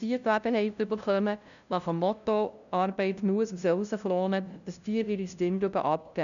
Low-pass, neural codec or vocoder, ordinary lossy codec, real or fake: 7.2 kHz; codec, 16 kHz, 0.5 kbps, FunCodec, trained on LibriTTS, 25 frames a second; none; fake